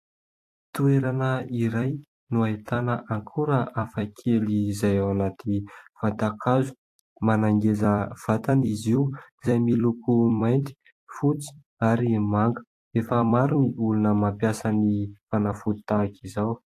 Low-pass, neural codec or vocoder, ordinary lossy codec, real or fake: 14.4 kHz; vocoder, 44.1 kHz, 128 mel bands every 256 samples, BigVGAN v2; AAC, 64 kbps; fake